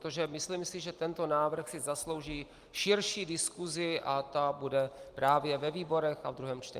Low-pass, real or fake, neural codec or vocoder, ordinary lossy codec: 14.4 kHz; real; none; Opus, 24 kbps